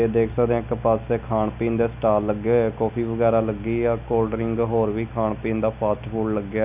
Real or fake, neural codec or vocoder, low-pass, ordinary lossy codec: real; none; 3.6 kHz; none